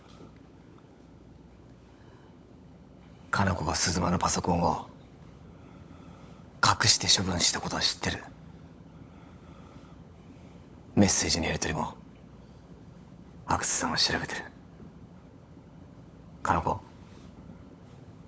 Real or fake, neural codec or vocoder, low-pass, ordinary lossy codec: fake; codec, 16 kHz, 16 kbps, FunCodec, trained on LibriTTS, 50 frames a second; none; none